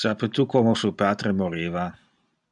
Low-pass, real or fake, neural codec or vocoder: 10.8 kHz; real; none